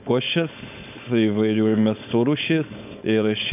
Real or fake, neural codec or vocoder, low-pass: fake; codec, 16 kHz, 4.8 kbps, FACodec; 3.6 kHz